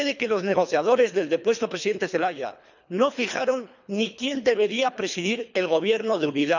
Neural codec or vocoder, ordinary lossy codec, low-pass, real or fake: codec, 24 kHz, 3 kbps, HILCodec; none; 7.2 kHz; fake